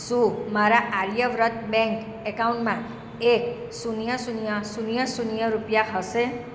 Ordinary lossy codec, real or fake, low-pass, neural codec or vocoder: none; real; none; none